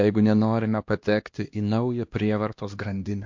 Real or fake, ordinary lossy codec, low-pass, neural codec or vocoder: fake; MP3, 48 kbps; 7.2 kHz; codec, 16 kHz, 1 kbps, X-Codec, HuBERT features, trained on LibriSpeech